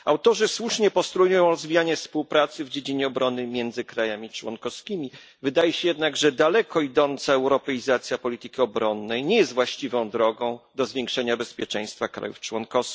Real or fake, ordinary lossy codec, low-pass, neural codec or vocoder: real; none; none; none